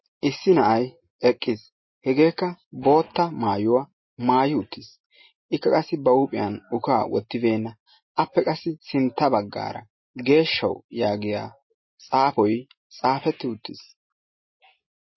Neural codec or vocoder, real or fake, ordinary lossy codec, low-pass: none; real; MP3, 24 kbps; 7.2 kHz